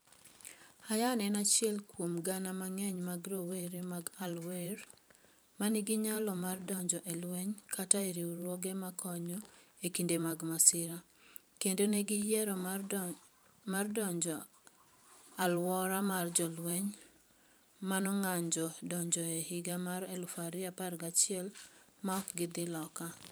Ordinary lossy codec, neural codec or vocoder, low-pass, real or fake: none; vocoder, 44.1 kHz, 128 mel bands every 512 samples, BigVGAN v2; none; fake